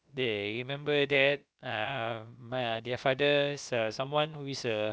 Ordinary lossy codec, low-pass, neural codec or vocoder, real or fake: none; none; codec, 16 kHz, about 1 kbps, DyCAST, with the encoder's durations; fake